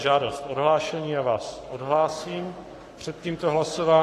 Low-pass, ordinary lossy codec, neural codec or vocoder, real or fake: 14.4 kHz; AAC, 48 kbps; codec, 44.1 kHz, 7.8 kbps, Pupu-Codec; fake